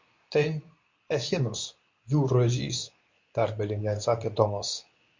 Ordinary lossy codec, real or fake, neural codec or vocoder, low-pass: MP3, 48 kbps; fake; codec, 24 kHz, 0.9 kbps, WavTokenizer, medium speech release version 2; 7.2 kHz